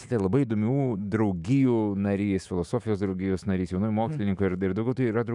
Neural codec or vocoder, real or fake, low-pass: autoencoder, 48 kHz, 128 numbers a frame, DAC-VAE, trained on Japanese speech; fake; 10.8 kHz